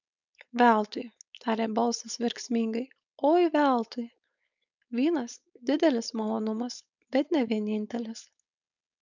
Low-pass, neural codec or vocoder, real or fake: 7.2 kHz; codec, 16 kHz, 4.8 kbps, FACodec; fake